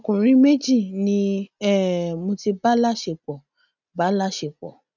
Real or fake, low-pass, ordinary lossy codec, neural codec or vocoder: real; 7.2 kHz; none; none